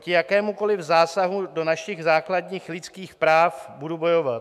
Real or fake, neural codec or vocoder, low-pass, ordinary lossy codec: fake; autoencoder, 48 kHz, 128 numbers a frame, DAC-VAE, trained on Japanese speech; 14.4 kHz; MP3, 96 kbps